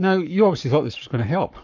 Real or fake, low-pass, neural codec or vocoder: fake; 7.2 kHz; codec, 44.1 kHz, 7.8 kbps, Pupu-Codec